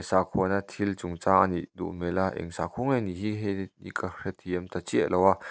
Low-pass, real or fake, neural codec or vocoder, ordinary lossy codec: none; real; none; none